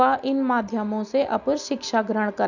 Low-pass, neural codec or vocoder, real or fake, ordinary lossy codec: 7.2 kHz; none; real; none